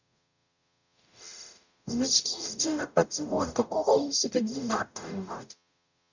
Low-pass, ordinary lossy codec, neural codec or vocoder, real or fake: 7.2 kHz; none; codec, 44.1 kHz, 0.9 kbps, DAC; fake